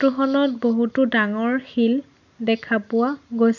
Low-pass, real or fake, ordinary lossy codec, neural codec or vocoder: 7.2 kHz; real; none; none